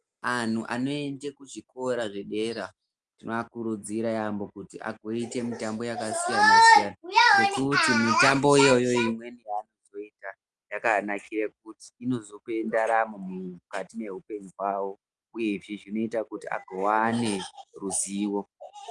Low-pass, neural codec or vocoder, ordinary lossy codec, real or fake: 10.8 kHz; none; Opus, 32 kbps; real